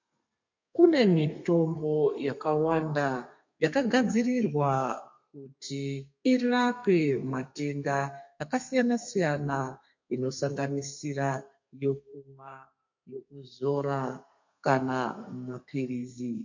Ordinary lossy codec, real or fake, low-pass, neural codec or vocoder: MP3, 48 kbps; fake; 7.2 kHz; codec, 32 kHz, 1.9 kbps, SNAC